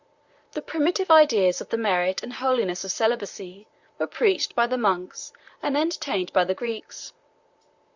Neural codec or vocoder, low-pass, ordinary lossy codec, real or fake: vocoder, 44.1 kHz, 128 mel bands, Pupu-Vocoder; 7.2 kHz; Opus, 64 kbps; fake